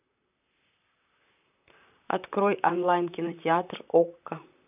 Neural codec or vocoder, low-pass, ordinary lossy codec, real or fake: vocoder, 44.1 kHz, 128 mel bands, Pupu-Vocoder; 3.6 kHz; none; fake